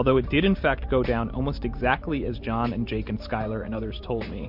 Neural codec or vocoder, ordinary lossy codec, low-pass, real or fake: vocoder, 44.1 kHz, 128 mel bands every 512 samples, BigVGAN v2; MP3, 48 kbps; 5.4 kHz; fake